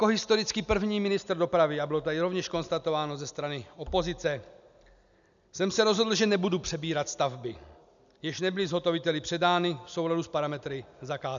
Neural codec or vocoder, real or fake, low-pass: none; real; 7.2 kHz